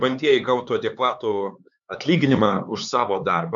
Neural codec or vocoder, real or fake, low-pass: codec, 16 kHz, 4 kbps, X-Codec, HuBERT features, trained on LibriSpeech; fake; 7.2 kHz